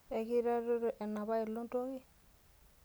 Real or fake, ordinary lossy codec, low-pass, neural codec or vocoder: real; none; none; none